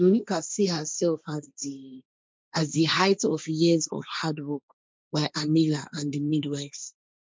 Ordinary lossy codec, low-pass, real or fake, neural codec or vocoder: none; none; fake; codec, 16 kHz, 1.1 kbps, Voila-Tokenizer